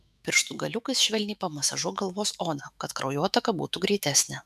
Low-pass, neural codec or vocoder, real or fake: 14.4 kHz; autoencoder, 48 kHz, 128 numbers a frame, DAC-VAE, trained on Japanese speech; fake